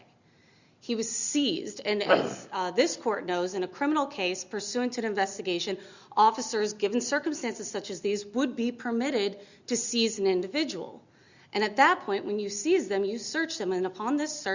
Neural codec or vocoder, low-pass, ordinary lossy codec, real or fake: none; 7.2 kHz; Opus, 64 kbps; real